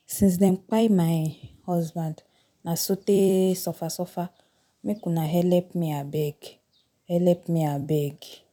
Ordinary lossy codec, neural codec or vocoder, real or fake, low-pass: none; vocoder, 44.1 kHz, 128 mel bands every 256 samples, BigVGAN v2; fake; 19.8 kHz